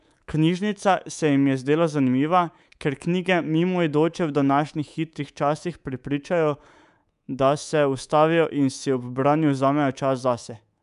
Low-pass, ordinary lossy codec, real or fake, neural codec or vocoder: 10.8 kHz; none; fake; codec, 24 kHz, 3.1 kbps, DualCodec